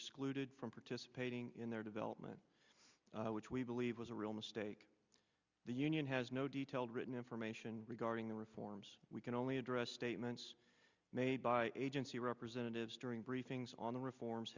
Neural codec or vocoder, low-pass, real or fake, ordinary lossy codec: none; 7.2 kHz; real; Opus, 64 kbps